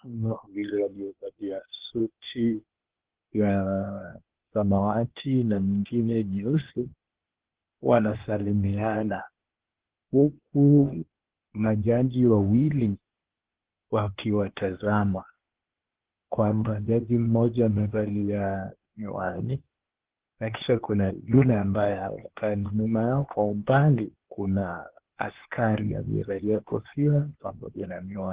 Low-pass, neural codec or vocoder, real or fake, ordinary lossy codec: 3.6 kHz; codec, 16 kHz, 0.8 kbps, ZipCodec; fake; Opus, 16 kbps